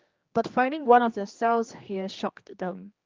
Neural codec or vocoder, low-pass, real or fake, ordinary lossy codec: codec, 16 kHz, 2 kbps, X-Codec, HuBERT features, trained on general audio; 7.2 kHz; fake; Opus, 32 kbps